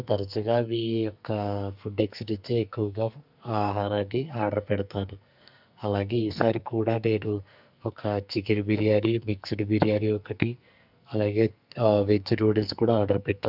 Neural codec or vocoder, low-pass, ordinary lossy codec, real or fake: codec, 44.1 kHz, 2.6 kbps, SNAC; 5.4 kHz; none; fake